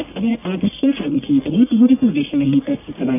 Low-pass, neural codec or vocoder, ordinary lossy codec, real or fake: 3.6 kHz; codec, 44.1 kHz, 1.7 kbps, Pupu-Codec; none; fake